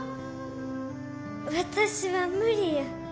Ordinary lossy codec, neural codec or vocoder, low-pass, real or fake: none; none; none; real